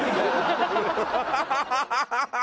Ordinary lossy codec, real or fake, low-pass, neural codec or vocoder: none; real; none; none